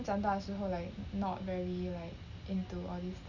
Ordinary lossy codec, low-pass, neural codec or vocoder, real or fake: none; 7.2 kHz; none; real